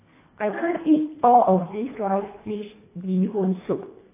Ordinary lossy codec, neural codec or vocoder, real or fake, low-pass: AAC, 16 kbps; codec, 24 kHz, 1.5 kbps, HILCodec; fake; 3.6 kHz